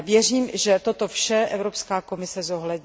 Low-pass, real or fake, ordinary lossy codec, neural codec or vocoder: none; real; none; none